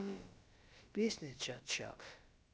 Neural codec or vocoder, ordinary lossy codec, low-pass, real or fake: codec, 16 kHz, about 1 kbps, DyCAST, with the encoder's durations; none; none; fake